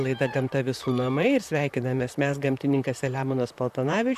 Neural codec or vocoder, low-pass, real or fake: vocoder, 44.1 kHz, 128 mel bands, Pupu-Vocoder; 14.4 kHz; fake